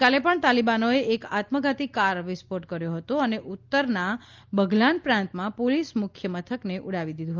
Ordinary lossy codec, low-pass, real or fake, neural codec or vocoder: Opus, 24 kbps; 7.2 kHz; real; none